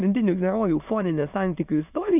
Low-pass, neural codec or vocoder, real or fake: 3.6 kHz; autoencoder, 22.05 kHz, a latent of 192 numbers a frame, VITS, trained on many speakers; fake